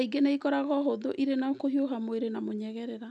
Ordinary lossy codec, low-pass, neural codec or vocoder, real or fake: none; none; none; real